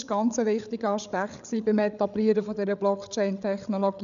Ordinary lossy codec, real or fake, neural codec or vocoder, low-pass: none; fake; codec, 16 kHz, 8 kbps, FreqCodec, larger model; 7.2 kHz